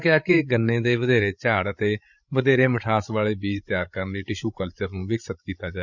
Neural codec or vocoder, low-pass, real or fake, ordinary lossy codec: codec, 16 kHz, 16 kbps, FreqCodec, larger model; 7.2 kHz; fake; none